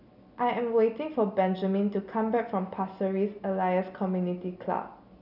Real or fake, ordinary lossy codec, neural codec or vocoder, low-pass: real; none; none; 5.4 kHz